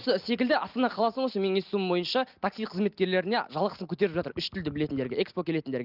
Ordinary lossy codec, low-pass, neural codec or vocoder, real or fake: Opus, 32 kbps; 5.4 kHz; none; real